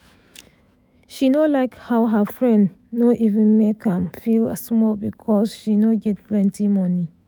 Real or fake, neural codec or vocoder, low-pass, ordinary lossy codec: fake; autoencoder, 48 kHz, 128 numbers a frame, DAC-VAE, trained on Japanese speech; none; none